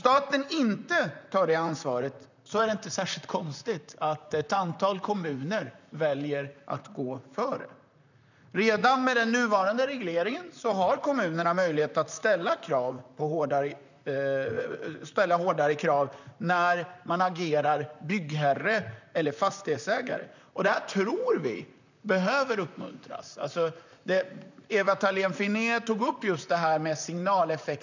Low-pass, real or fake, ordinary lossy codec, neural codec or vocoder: 7.2 kHz; fake; none; vocoder, 44.1 kHz, 128 mel bands, Pupu-Vocoder